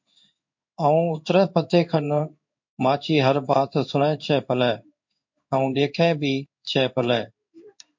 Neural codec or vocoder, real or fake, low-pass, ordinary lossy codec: codec, 16 kHz in and 24 kHz out, 1 kbps, XY-Tokenizer; fake; 7.2 kHz; MP3, 48 kbps